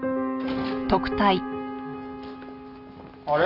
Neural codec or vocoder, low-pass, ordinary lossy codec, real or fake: none; 5.4 kHz; none; real